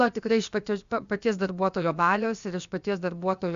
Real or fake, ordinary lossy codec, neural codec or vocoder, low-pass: fake; Opus, 64 kbps; codec, 16 kHz, about 1 kbps, DyCAST, with the encoder's durations; 7.2 kHz